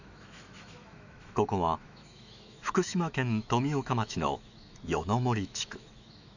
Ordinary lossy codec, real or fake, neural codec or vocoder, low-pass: none; fake; autoencoder, 48 kHz, 128 numbers a frame, DAC-VAE, trained on Japanese speech; 7.2 kHz